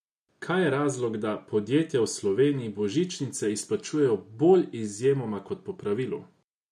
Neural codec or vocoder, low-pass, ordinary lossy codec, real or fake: none; none; none; real